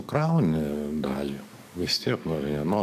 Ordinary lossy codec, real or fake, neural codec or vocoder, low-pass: AAC, 96 kbps; fake; codec, 44.1 kHz, 7.8 kbps, DAC; 14.4 kHz